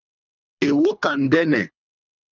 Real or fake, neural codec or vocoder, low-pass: fake; codec, 24 kHz, 3 kbps, HILCodec; 7.2 kHz